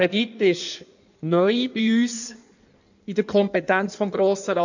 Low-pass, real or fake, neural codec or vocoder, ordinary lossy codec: 7.2 kHz; fake; codec, 16 kHz in and 24 kHz out, 1.1 kbps, FireRedTTS-2 codec; none